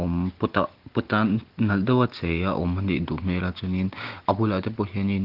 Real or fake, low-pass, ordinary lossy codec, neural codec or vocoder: real; 5.4 kHz; Opus, 16 kbps; none